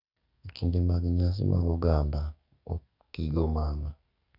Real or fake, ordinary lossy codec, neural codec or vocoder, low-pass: fake; Opus, 64 kbps; codec, 44.1 kHz, 2.6 kbps, SNAC; 5.4 kHz